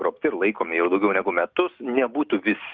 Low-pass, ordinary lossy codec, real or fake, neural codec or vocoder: 7.2 kHz; Opus, 24 kbps; real; none